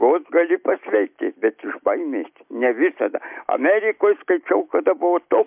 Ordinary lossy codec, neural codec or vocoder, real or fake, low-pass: MP3, 32 kbps; none; real; 3.6 kHz